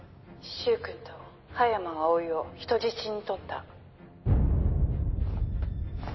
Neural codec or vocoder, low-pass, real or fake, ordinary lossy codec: none; 7.2 kHz; real; MP3, 24 kbps